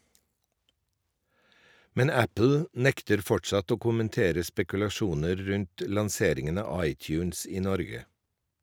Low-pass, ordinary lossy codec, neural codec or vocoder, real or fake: none; none; none; real